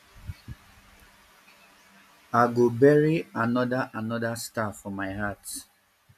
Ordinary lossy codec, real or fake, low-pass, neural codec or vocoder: AAC, 96 kbps; real; 14.4 kHz; none